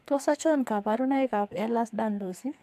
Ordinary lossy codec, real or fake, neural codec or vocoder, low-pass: AAC, 96 kbps; fake; codec, 44.1 kHz, 2.6 kbps, SNAC; 14.4 kHz